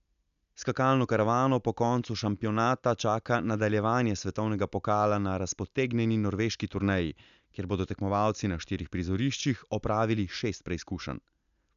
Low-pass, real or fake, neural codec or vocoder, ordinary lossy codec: 7.2 kHz; real; none; MP3, 96 kbps